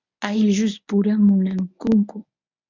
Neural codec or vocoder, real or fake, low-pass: codec, 24 kHz, 0.9 kbps, WavTokenizer, medium speech release version 1; fake; 7.2 kHz